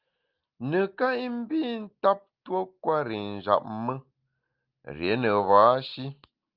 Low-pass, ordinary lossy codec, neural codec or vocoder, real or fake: 5.4 kHz; Opus, 24 kbps; none; real